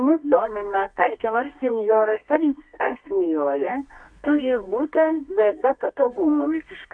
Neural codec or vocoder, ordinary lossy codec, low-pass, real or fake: codec, 24 kHz, 0.9 kbps, WavTokenizer, medium music audio release; MP3, 64 kbps; 9.9 kHz; fake